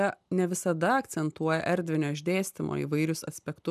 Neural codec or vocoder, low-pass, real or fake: none; 14.4 kHz; real